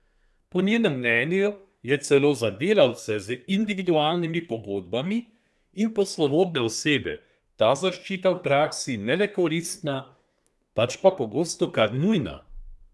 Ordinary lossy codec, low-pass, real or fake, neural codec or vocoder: none; none; fake; codec, 24 kHz, 1 kbps, SNAC